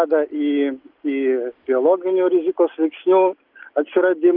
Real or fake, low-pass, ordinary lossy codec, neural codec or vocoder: real; 5.4 kHz; Opus, 24 kbps; none